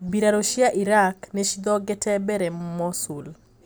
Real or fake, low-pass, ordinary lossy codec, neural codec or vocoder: real; none; none; none